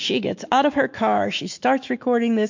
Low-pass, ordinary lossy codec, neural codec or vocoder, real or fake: 7.2 kHz; MP3, 48 kbps; autoencoder, 48 kHz, 128 numbers a frame, DAC-VAE, trained on Japanese speech; fake